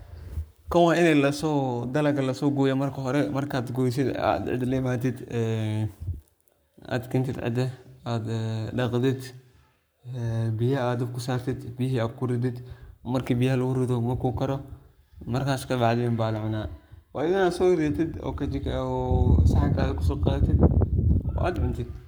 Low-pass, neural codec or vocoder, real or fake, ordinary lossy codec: none; codec, 44.1 kHz, 7.8 kbps, Pupu-Codec; fake; none